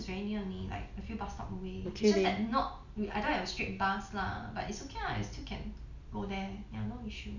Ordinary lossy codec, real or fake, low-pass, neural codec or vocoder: none; real; 7.2 kHz; none